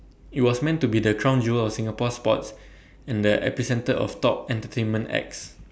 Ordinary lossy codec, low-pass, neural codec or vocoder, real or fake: none; none; none; real